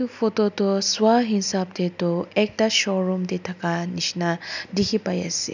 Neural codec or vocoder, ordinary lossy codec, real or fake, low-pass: none; none; real; 7.2 kHz